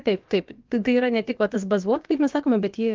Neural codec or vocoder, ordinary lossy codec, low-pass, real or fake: codec, 16 kHz, about 1 kbps, DyCAST, with the encoder's durations; Opus, 32 kbps; 7.2 kHz; fake